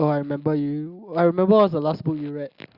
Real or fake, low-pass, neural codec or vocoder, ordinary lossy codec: real; 5.4 kHz; none; Opus, 64 kbps